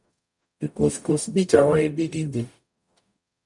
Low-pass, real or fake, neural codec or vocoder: 10.8 kHz; fake; codec, 44.1 kHz, 0.9 kbps, DAC